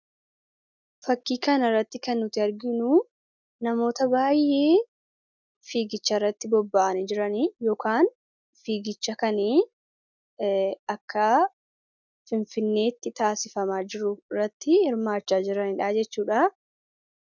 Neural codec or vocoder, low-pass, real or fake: none; 7.2 kHz; real